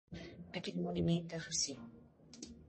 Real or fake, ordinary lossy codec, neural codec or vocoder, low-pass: fake; MP3, 32 kbps; codec, 44.1 kHz, 1.7 kbps, Pupu-Codec; 10.8 kHz